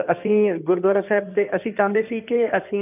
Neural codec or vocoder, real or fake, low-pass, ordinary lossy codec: codec, 16 kHz in and 24 kHz out, 2.2 kbps, FireRedTTS-2 codec; fake; 3.6 kHz; none